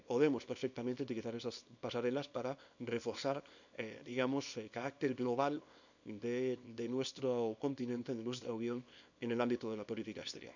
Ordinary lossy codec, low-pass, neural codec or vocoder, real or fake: none; 7.2 kHz; codec, 24 kHz, 0.9 kbps, WavTokenizer, small release; fake